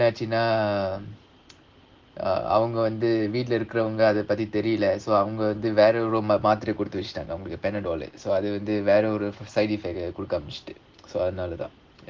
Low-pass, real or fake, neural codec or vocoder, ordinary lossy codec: 7.2 kHz; real; none; Opus, 16 kbps